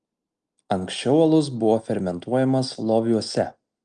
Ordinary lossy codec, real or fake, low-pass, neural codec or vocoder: Opus, 32 kbps; real; 9.9 kHz; none